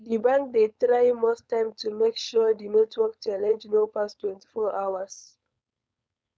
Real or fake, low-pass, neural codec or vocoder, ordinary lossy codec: fake; none; codec, 16 kHz, 4.8 kbps, FACodec; none